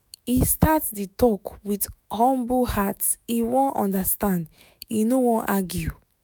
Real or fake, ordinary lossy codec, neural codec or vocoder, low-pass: fake; none; autoencoder, 48 kHz, 128 numbers a frame, DAC-VAE, trained on Japanese speech; none